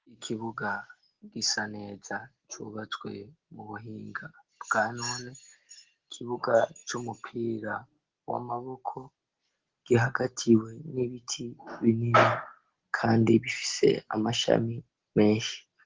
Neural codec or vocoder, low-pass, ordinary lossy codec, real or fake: none; 7.2 kHz; Opus, 16 kbps; real